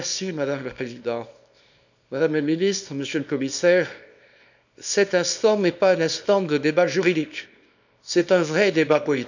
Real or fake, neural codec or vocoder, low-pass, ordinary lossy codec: fake; codec, 24 kHz, 0.9 kbps, WavTokenizer, small release; 7.2 kHz; none